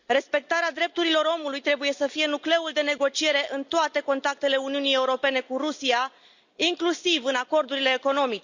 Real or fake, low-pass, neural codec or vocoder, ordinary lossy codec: real; 7.2 kHz; none; Opus, 64 kbps